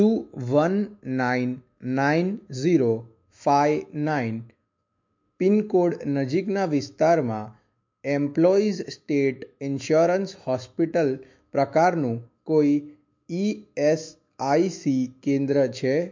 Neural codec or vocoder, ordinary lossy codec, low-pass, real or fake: autoencoder, 48 kHz, 128 numbers a frame, DAC-VAE, trained on Japanese speech; MP3, 48 kbps; 7.2 kHz; fake